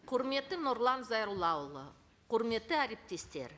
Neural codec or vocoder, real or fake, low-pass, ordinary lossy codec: none; real; none; none